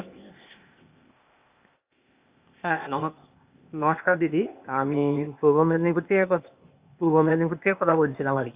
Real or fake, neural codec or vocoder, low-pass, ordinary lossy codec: fake; codec, 16 kHz, 0.8 kbps, ZipCodec; 3.6 kHz; Opus, 64 kbps